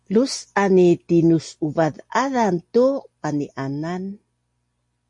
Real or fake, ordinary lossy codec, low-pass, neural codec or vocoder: real; MP3, 48 kbps; 10.8 kHz; none